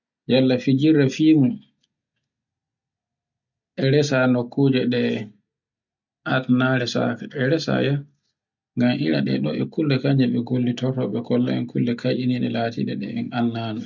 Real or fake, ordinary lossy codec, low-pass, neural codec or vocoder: real; none; 7.2 kHz; none